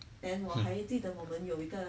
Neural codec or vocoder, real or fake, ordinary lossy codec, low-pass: none; real; none; none